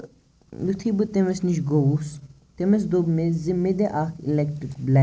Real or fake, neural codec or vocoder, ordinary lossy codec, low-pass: real; none; none; none